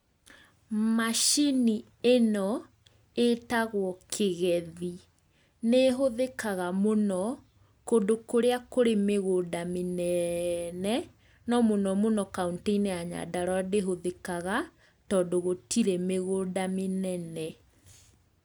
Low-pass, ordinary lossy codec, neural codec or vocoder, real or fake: none; none; none; real